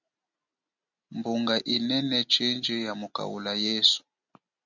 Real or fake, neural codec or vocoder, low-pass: real; none; 7.2 kHz